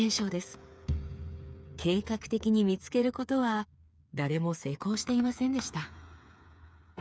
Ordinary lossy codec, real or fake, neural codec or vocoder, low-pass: none; fake; codec, 16 kHz, 8 kbps, FreqCodec, smaller model; none